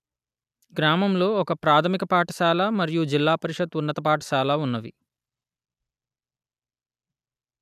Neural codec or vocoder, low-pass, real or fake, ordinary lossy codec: none; 14.4 kHz; real; none